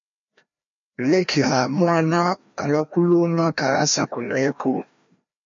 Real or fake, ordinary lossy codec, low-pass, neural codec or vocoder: fake; MP3, 48 kbps; 7.2 kHz; codec, 16 kHz, 1 kbps, FreqCodec, larger model